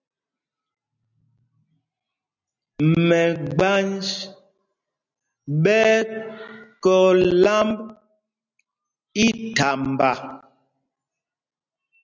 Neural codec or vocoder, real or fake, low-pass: none; real; 7.2 kHz